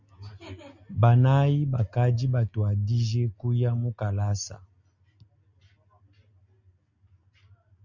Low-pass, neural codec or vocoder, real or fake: 7.2 kHz; none; real